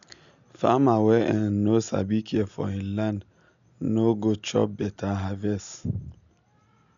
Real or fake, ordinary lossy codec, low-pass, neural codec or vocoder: real; none; 7.2 kHz; none